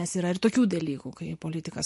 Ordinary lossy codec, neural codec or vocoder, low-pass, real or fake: MP3, 48 kbps; none; 14.4 kHz; real